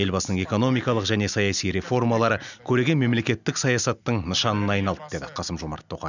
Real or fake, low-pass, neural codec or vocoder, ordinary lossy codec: real; 7.2 kHz; none; none